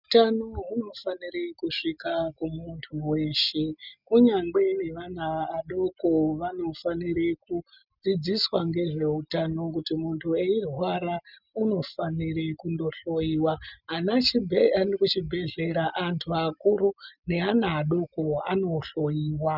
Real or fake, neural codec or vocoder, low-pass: real; none; 5.4 kHz